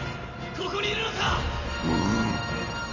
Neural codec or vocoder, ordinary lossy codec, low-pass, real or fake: none; none; 7.2 kHz; real